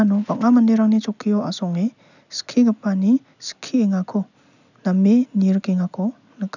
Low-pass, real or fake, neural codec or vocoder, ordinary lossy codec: 7.2 kHz; real; none; none